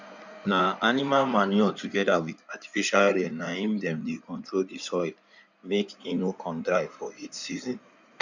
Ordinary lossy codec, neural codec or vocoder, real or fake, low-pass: none; codec, 16 kHz in and 24 kHz out, 2.2 kbps, FireRedTTS-2 codec; fake; 7.2 kHz